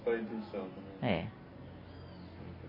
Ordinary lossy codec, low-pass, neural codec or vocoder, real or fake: MP3, 24 kbps; 5.4 kHz; none; real